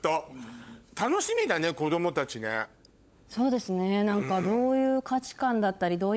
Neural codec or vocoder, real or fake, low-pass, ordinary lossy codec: codec, 16 kHz, 16 kbps, FunCodec, trained on LibriTTS, 50 frames a second; fake; none; none